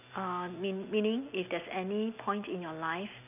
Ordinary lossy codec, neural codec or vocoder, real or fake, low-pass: none; none; real; 3.6 kHz